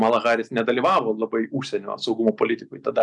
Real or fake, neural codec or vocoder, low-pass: real; none; 10.8 kHz